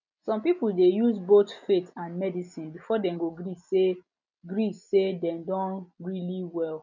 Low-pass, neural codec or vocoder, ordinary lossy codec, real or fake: 7.2 kHz; none; none; real